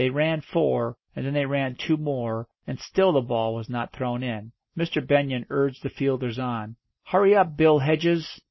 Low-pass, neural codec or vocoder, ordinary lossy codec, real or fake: 7.2 kHz; none; MP3, 24 kbps; real